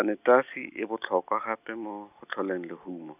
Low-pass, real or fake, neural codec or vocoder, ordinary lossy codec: 3.6 kHz; real; none; none